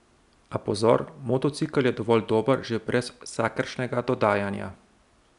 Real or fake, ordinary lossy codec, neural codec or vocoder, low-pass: real; none; none; 10.8 kHz